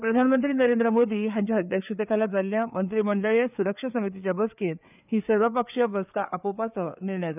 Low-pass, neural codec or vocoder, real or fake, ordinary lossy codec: 3.6 kHz; codec, 16 kHz, 4 kbps, FreqCodec, larger model; fake; none